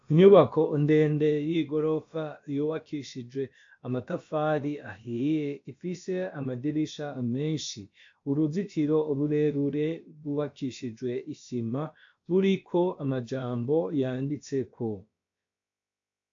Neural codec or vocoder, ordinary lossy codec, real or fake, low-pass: codec, 16 kHz, about 1 kbps, DyCAST, with the encoder's durations; AAC, 48 kbps; fake; 7.2 kHz